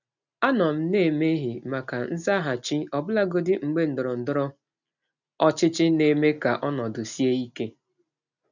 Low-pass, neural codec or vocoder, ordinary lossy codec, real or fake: 7.2 kHz; none; none; real